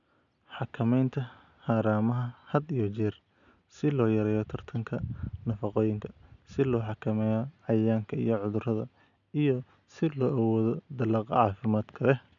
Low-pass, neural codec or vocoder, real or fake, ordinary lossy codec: 7.2 kHz; none; real; none